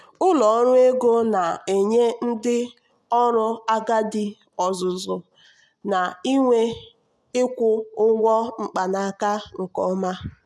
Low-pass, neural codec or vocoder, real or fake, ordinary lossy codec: none; none; real; none